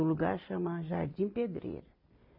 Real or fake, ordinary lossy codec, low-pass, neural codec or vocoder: real; AAC, 48 kbps; 5.4 kHz; none